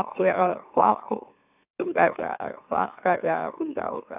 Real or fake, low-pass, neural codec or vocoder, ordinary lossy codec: fake; 3.6 kHz; autoencoder, 44.1 kHz, a latent of 192 numbers a frame, MeloTTS; none